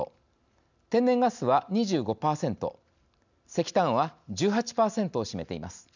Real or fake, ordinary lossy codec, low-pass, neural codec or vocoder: real; none; 7.2 kHz; none